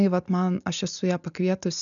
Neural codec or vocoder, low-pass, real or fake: none; 7.2 kHz; real